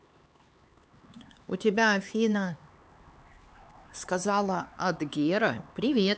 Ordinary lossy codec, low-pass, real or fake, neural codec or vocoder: none; none; fake; codec, 16 kHz, 4 kbps, X-Codec, HuBERT features, trained on LibriSpeech